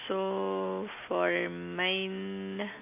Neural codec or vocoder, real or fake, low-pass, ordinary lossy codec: none; real; 3.6 kHz; none